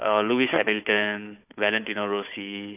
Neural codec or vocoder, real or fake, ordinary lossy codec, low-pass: codec, 16 kHz, 4 kbps, FunCodec, trained on Chinese and English, 50 frames a second; fake; none; 3.6 kHz